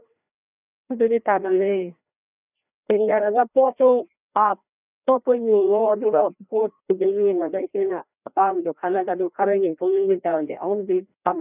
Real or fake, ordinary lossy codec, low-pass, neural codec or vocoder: fake; none; 3.6 kHz; codec, 16 kHz, 1 kbps, FreqCodec, larger model